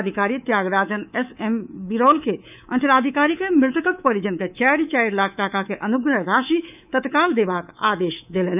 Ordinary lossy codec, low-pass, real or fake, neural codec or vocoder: none; 3.6 kHz; fake; codec, 24 kHz, 3.1 kbps, DualCodec